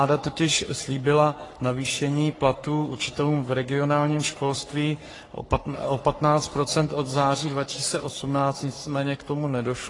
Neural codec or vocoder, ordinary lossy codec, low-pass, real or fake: codec, 44.1 kHz, 3.4 kbps, Pupu-Codec; AAC, 32 kbps; 10.8 kHz; fake